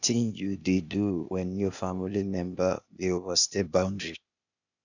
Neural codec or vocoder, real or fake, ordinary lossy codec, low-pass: codec, 16 kHz, 0.8 kbps, ZipCodec; fake; none; 7.2 kHz